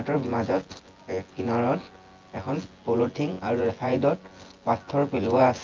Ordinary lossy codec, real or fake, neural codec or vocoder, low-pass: Opus, 32 kbps; fake; vocoder, 24 kHz, 100 mel bands, Vocos; 7.2 kHz